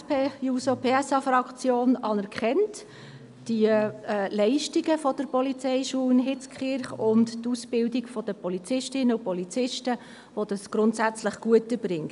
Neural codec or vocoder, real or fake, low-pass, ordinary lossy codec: none; real; 10.8 kHz; none